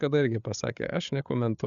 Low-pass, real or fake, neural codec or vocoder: 7.2 kHz; fake; codec, 16 kHz, 8 kbps, FreqCodec, larger model